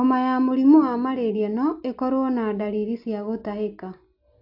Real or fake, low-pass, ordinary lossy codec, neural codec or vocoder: real; 5.4 kHz; AAC, 48 kbps; none